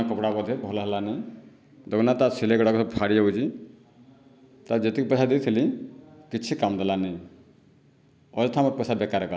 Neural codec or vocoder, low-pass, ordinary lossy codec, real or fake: none; none; none; real